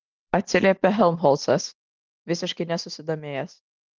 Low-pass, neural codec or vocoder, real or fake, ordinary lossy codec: 7.2 kHz; none; real; Opus, 24 kbps